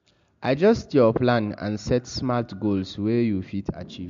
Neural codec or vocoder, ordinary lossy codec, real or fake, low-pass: none; MP3, 48 kbps; real; 7.2 kHz